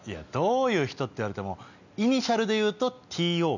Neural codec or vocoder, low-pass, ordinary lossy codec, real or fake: none; 7.2 kHz; none; real